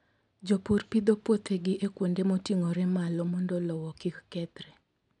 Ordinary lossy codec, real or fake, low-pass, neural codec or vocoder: none; real; 10.8 kHz; none